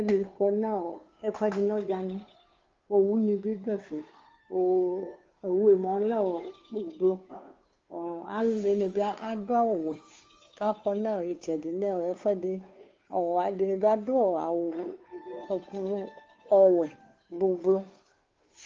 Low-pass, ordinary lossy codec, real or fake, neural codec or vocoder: 7.2 kHz; Opus, 32 kbps; fake; codec, 16 kHz, 2 kbps, FunCodec, trained on Chinese and English, 25 frames a second